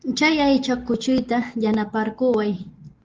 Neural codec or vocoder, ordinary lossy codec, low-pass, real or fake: none; Opus, 16 kbps; 7.2 kHz; real